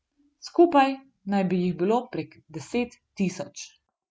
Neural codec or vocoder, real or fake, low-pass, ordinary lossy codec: none; real; none; none